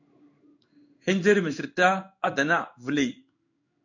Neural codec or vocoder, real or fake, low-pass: codec, 16 kHz in and 24 kHz out, 1 kbps, XY-Tokenizer; fake; 7.2 kHz